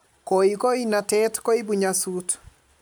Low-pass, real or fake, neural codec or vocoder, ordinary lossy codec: none; real; none; none